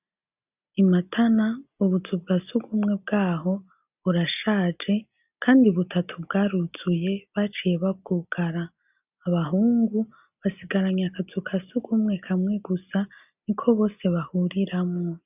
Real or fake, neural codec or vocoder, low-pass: real; none; 3.6 kHz